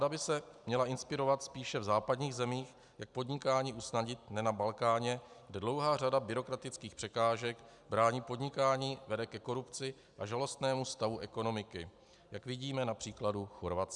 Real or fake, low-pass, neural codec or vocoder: real; 10.8 kHz; none